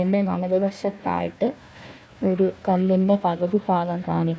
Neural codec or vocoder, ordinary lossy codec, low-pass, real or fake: codec, 16 kHz, 1 kbps, FunCodec, trained on Chinese and English, 50 frames a second; none; none; fake